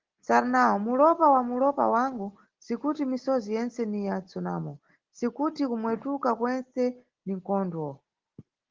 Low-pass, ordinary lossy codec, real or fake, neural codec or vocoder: 7.2 kHz; Opus, 16 kbps; real; none